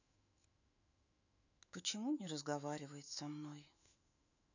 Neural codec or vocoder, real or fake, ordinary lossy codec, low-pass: autoencoder, 48 kHz, 128 numbers a frame, DAC-VAE, trained on Japanese speech; fake; none; 7.2 kHz